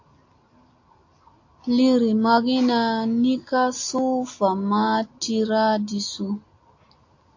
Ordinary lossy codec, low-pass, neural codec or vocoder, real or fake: AAC, 48 kbps; 7.2 kHz; none; real